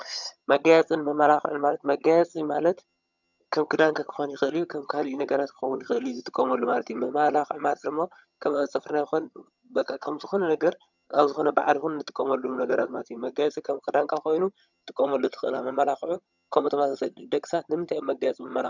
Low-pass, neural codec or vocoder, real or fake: 7.2 kHz; vocoder, 22.05 kHz, 80 mel bands, HiFi-GAN; fake